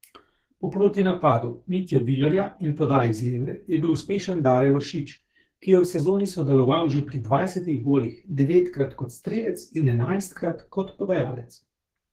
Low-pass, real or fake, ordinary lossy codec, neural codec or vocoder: 14.4 kHz; fake; Opus, 16 kbps; codec, 32 kHz, 1.9 kbps, SNAC